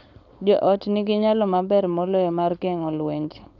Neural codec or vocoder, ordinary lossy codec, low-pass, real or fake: codec, 16 kHz, 4.8 kbps, FACodec; none; 7.2 kHz; fake